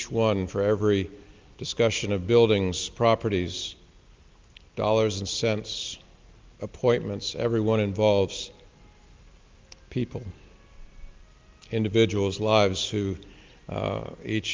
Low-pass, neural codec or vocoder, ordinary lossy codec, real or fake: 7.2 kHz; none; Opus, 32 kbps; real